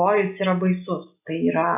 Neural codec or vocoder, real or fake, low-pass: none; real; 3.6 kHz